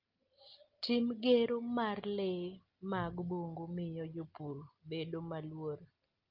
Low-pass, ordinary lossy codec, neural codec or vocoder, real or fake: 5.4 kHz; Opus, 32 kbps; none; real